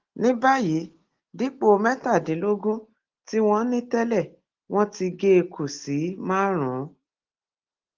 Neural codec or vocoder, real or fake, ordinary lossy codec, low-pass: none; real; Opus, 16 kbps; 7.2 kHz